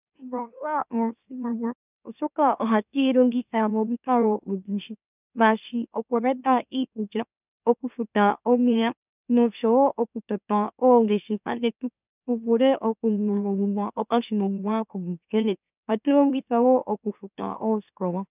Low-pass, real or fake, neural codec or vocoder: 3.6 kHz; fake; autoencoder, 44.1 kHz, a latent of 192 numbers a frame, MeloTTS